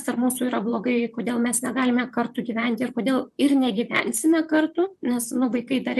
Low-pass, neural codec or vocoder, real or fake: 14.4 kHz; vocoder, 44.1 kHz, 128 mel bands, Pupu-Vocoder; fake